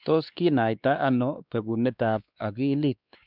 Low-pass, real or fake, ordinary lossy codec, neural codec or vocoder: 5.4 kHz; fake; none; codec, 16 kHz, 2 kbps, X-Codec, WavLM features, trained on Multilingual LibriSpeech